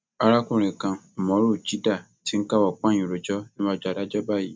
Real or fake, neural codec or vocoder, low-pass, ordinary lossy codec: real; none; none; none